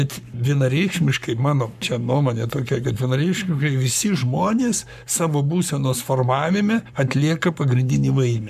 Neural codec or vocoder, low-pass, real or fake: codec, 44.1 kHz, 7.8 kbps, Pupu-Codec; 14.4 kHz; fake